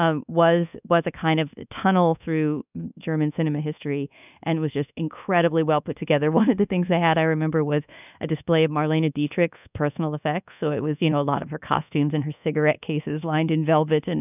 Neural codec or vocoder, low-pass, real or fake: codec, 24 kHz, 1.2 kbps, DualCodec; 3.6 kHz; fake